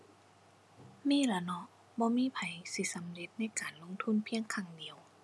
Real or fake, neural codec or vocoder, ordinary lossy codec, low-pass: real; none; none; none